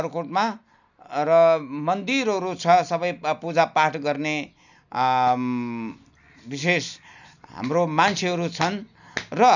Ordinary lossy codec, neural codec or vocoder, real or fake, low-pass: none; none; real; 7.2 kHz